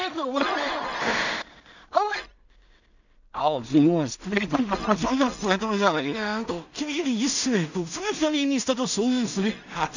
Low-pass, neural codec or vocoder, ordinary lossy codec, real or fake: 7.2 kHz; codec, 16 kHz in and 24 kHz out, 0.4 kbps, LongCat-Audio-Codec, two codebook decoder; none; fake